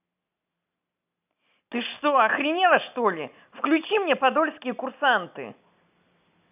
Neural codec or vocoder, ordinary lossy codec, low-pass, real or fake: none; none; 3.6 kHz; real